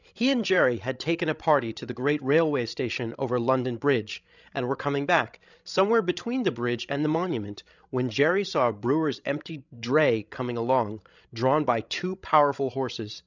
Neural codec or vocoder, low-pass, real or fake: codec, 16 kHz, 16 kbps, FreqCodec, larger model; 7.2 kHz; fake